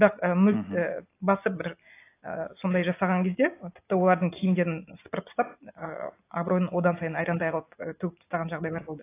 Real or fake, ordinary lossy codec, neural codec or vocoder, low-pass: real; AAC, 24 kbps; none; 3.6 kHz